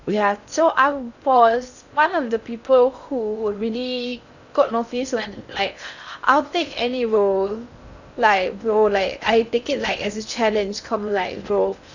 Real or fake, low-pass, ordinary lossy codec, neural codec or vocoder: fake; 7.2 kHz; none; codec, 16 kHz in and 24 kHz out, 0.6 kbps, FocalCodec, streaming, 2048 codes